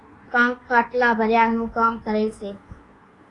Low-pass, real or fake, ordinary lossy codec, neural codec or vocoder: 10.8 kHz; fake; AAC, 32 kbps; codec, 24 kHz, 1.2 kbps, DualCodec